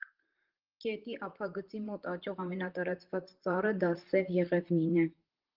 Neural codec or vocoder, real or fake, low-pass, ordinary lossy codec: vocoder, 44.1 kHz, 128 mel bands, Pupu-Vocoder; fake; 5.4 kHz; Opus, 16 kbps